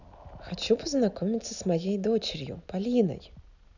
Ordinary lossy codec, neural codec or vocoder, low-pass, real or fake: none; none; 7.2 kHz; real